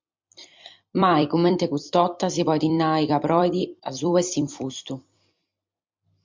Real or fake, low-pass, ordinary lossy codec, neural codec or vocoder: fake; 7.2 kHz; MP3, 64 kbps; vocoder, 44.1 kHz, 128 mel bands every 256 samples, BigVGAN v2